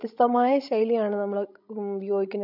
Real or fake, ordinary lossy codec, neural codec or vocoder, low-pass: fake; none; codec, 16 kHz, 16 kbps, FreqCodec, larger model; 5.4 kHz